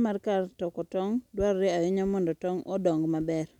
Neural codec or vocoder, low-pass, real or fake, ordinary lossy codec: none; 19.8 kHz; real; none